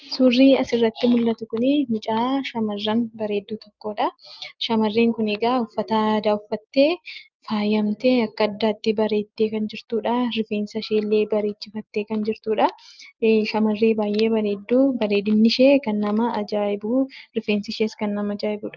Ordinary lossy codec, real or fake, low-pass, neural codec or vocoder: Opus, 24 kbps; real; 7.2 kHz; none